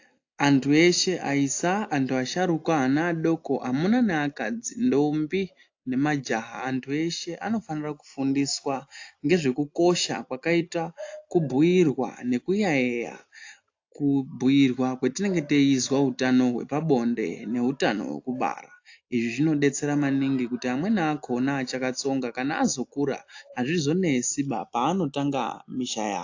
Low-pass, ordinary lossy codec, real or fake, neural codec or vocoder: 7.2 kHz; AAC, 48 kbps; real; none